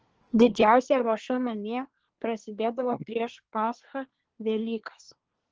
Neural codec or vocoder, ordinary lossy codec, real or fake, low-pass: codec, 24 kHz, 1 kbps, SNAC; Opus, 16 kbps; fake; 7.2 kHz